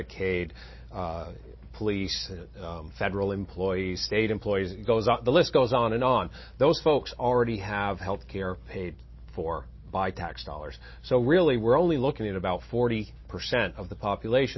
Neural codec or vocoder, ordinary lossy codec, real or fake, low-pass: none; MP3, 24 kbps; real; 7.2 kHz